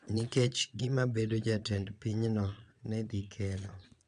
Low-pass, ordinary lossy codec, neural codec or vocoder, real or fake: 9.9 kHz; none; vocoder, 22.05 kHz, 80 mel bands, Vocos; fake